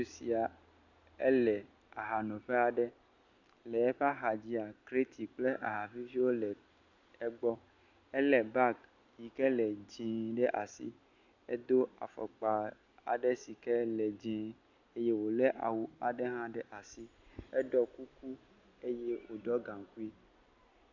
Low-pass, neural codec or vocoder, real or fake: 7.2 kHz; none; real